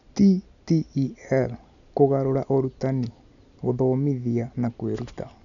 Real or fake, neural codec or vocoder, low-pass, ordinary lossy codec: real; none; 7.2 kHz; none